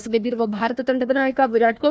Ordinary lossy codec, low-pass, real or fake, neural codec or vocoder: none; none; fake; codec, 16 kHz, 1 kbps, FunCodec, trained on Chinese and English, 50 frames a second